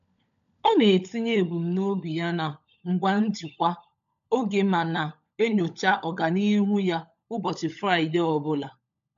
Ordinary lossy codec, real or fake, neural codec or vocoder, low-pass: MP3, 64 kbps; fake; codec, 16 kHz, 16 kbps, FunCodec, trained on LibriTTS, 50 frames a second; 7.2 kHz